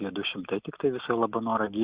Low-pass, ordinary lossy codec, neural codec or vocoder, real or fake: 3.6 kHz; Opus, 64 kbps; none; real